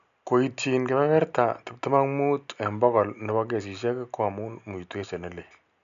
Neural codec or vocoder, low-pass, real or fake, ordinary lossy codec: none; 7.2 kHz; real; none